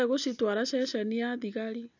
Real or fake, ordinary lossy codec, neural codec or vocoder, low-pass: real; none; none; 7.2 kHz